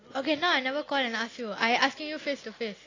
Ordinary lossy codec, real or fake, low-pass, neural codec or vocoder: AAC, 32 kbps; real; 7.2 kHz; none